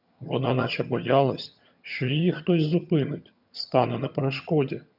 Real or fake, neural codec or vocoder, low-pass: fake; vocoder, 22.05 kHz, 80 mel bands, HiFi-GAN; 5.4 kHz